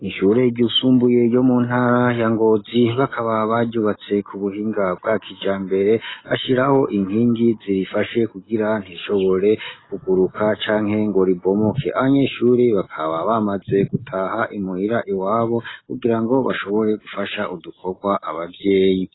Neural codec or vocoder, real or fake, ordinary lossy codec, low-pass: none; real; AAC, 16 kbps; 7.2 kHz